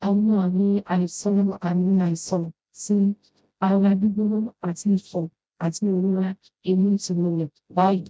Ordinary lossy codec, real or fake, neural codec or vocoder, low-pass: none; fake; codec, 16 kHz, 0.5 kbps, FreqCodec, smaller model; none